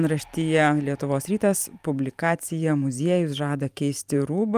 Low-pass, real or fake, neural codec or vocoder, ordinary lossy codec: 14.4 kHz; fake; vocoder, 44.1 kHz, 128 mel bands every 512 samples, BigVGAN v2; Opus, 32 kbps